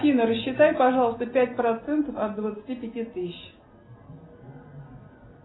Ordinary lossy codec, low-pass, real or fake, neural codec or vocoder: AAC, 16 kbps; 7.2 kHz; real; none